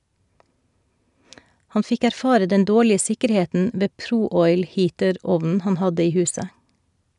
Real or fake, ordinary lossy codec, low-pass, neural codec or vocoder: real; none; 10.8 kHz; none